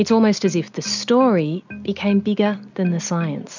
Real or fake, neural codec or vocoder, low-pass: real; none; 7.2 kHz